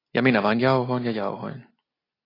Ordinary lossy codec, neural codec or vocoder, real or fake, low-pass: AAC, 24 kbps; none; real; 5.4 kHz